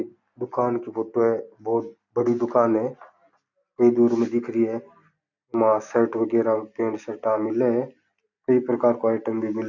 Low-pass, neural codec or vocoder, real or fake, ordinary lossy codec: 7.2 kHz; none; real; none